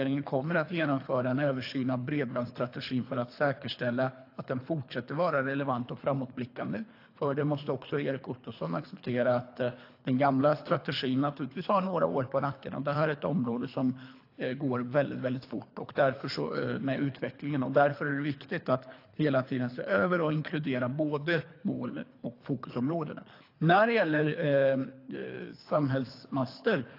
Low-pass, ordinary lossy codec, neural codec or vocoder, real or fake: 5.4 kHz; AAC, 32 kbps; codec, 24 kHz, 3 kbps, HILCodec; fake